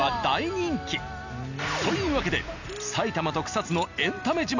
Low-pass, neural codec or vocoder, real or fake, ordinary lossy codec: 7.2 kHz; none; real; none